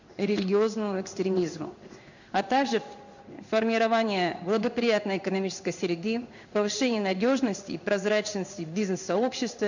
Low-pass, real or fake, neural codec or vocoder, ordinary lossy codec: 7.2 kHz; fake; codec, 16 kHz in and 24 kHz out, 1 kbps, XY-Tokenizer; none